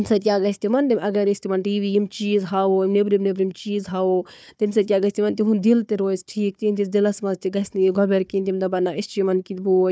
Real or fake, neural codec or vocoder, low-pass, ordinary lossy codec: fake; codec, 16 kHz, 4 kbps, FunCodec, trained on Chinese and English, 50 frames a second; none; none